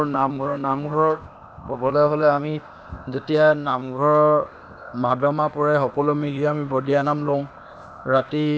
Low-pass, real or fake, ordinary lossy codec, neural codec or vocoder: none; fake; none; codec, 16 kHz, 0.8 kbps, ZipCodec